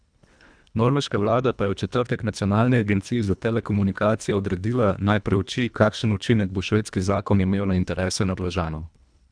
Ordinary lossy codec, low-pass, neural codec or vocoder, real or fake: none; 9.9 kHz; codec, 24 kHz, 1.5 kbps, HILCodec; fake